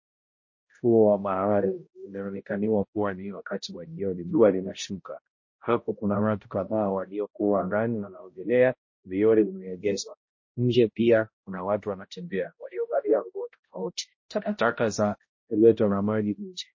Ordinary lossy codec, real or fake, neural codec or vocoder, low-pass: MP3, 32 kbps; fake; codec, 16 kHz, 0.5 kbps, X-Codec, HuBERT features, trained on balanced general audio; 7.2 kHz